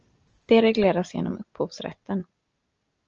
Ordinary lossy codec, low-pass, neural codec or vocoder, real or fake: Opus, 24 kbps; 7.2 kHz; none; real